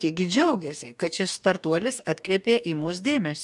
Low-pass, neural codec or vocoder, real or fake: 10.8 kHz; codec, 44.1 kHz, 2.6 kbps, DAC; fake